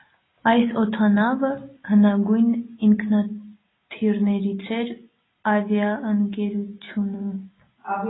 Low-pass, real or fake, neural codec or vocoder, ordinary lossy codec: 7.2 kHz; real; none; AAC, 16 kbps